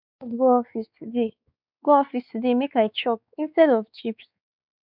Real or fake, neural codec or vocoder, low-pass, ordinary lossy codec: fake; codec, 16 kHz, 4 kbps, X-Codec, WavLM features, trained on Multilingual LibriSpeech; 5.4 kHz; none